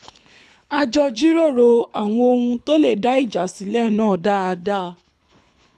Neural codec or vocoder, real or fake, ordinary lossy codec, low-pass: codec, 24 kHz, 6 kbps, HILCodec; fake; none; none